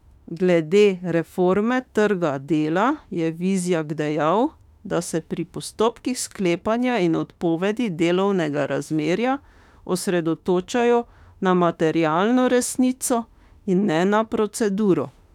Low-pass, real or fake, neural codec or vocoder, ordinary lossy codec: 19.8 kHz; fake; autoencoder, 48 kHz, 32 numbers a frame, DAC-VAE, trained on Japanese speech; none